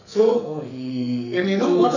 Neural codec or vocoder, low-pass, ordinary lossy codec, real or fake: codec, 44.1 kHz, 2.6 kbps, SNAC; 7.2 kHz; none; fake